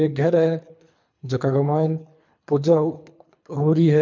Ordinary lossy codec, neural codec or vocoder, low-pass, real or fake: MP3, 64 kbps; codec, 24 kHz, 3 kbps, HILCodec; 7.2 kHz; fake